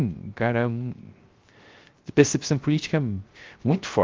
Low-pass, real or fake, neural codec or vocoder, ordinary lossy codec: 7.2 kHz; fake; codec, 16 kHz, 0.3 kbps, FocalCodec; Opus, 32 kbps